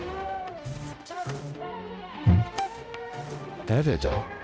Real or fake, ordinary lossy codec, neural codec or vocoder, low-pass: fake; none; codec, 16 kHz, 0.5 kbps, X-Codec, HuBERT features, trained on balanced general audio; none